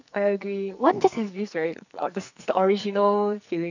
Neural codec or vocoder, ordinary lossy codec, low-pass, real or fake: codec, 44.1 kHz, 2.6 kbps, SNAC; none; 7.2 kHz; fake